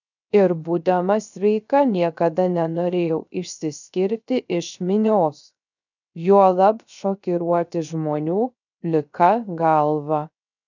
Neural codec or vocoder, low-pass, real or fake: codec, 16 kHz, 0.3 kbps, FocalCodec; 7.2 kHz; fake